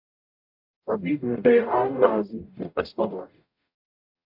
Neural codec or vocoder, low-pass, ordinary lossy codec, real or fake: codec, 44.1 kHz, 0.9 kbps, DAC; 5.4 kHz; MP3, 48 kbps; fake